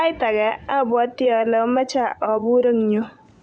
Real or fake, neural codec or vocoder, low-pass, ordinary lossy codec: fake; vocoder, 24 kHz, 100 mel bands, Vocos; 9.9 kHz; none